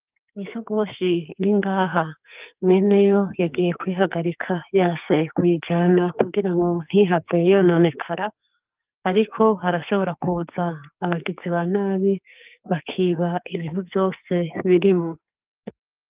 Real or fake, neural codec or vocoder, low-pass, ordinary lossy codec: fake; codec, 44.1 kHz, 2.6 kbps, SNAC; 3.6 kHz; Opus, 32 kbps